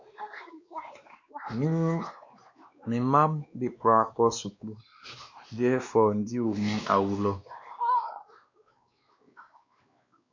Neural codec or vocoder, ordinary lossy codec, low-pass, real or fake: codec, 16 kHz, 2 kbps, X-Codec, WavLM features, trained on Multilingual LibriSpeech; MP3, 48 kbps; 7.2 kHz; fake